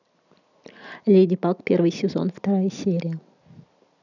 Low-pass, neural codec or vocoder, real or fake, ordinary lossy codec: 7.2 kHz; none; real; none